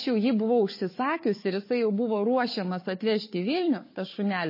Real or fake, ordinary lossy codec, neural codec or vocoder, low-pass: fake; MP3, 24 kbps; codec, 16 kHz, 4 kbps, FunCodec, trained on Chinese and English, 50 frames a second; 5.4 kHz